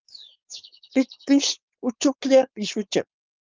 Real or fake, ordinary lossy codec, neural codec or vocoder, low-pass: fake; Opus, 24 kbps; codec, 16 kHz, 4.8 kbps, FACodec; 7.2 kHz